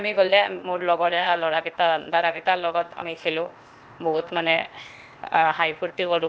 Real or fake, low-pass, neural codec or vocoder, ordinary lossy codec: fake; none; codec, 16 kHz, 0.8 kbps, ZipCodec; none